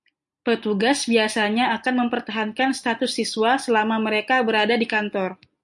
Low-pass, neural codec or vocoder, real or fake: 10.8 kHz; none; real